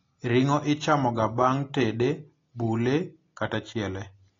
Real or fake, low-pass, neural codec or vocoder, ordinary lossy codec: real; 7.2 kHz; none; AAC, 32 kbps